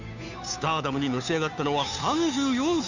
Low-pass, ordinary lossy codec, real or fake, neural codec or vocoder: 7.2 kHz; none; fake; codec, 16 kHz, 2 kbps, FunCodec, trained on Chinese and English, 25 frames a second